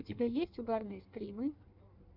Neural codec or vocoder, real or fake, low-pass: codec, 16 kHz in and 24 kHz out, 1.1 kbps, FireRedTTS-2 codec; fake; 5.4 kHz